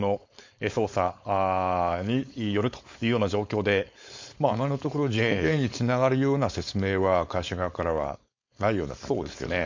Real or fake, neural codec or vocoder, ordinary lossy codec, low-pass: fake; codec, 16 kHz, 4.8 kbps, FACodec; MP3, 48 kbps; 7.2 kHz